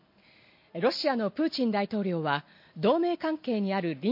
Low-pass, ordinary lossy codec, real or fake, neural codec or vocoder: 5.4 kHz; MP3, 32 kbps; real; none